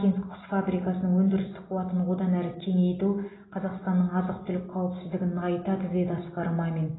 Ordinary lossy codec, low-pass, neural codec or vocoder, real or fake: AAC, 16 kbps; 7.2 kHz; none; real